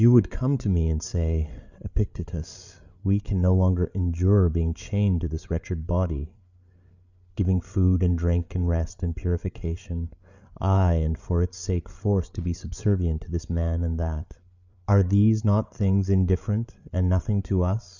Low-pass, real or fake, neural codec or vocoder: 7.2 kHz; fake; codec, 16 kHz, 8 kbps, FreqCodec, larger model